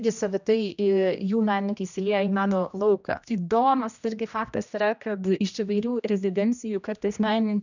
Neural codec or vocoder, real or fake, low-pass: codec, 16 kHz, 1 kbps, X-Codec, HuBERT features, trained on general audio; fake; 7.2 kHz